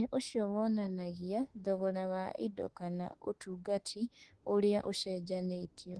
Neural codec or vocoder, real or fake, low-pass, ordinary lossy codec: autoencoder, 48 kHz, 32 numbers a frame, DAC-VAE, trained on Japanese speech; fake; 10.8 kHz; Opus, 16 kbps